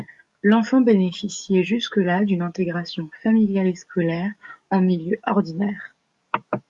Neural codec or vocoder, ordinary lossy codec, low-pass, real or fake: codec, 44.1 kHz, 7.8 kbps, DAC; MP3, 64 kbps; 10.8 kHz; fake